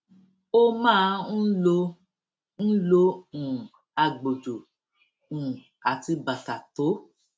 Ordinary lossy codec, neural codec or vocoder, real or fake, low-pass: none; none; real; none